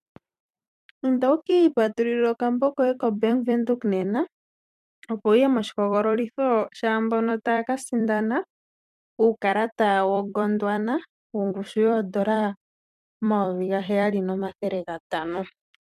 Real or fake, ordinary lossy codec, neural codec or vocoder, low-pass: fake; MP3, 96 kbps; vocoder, 44.1 kHz, 128 mel bands every 256 samples, BigVGAN v2; 14.4 kHz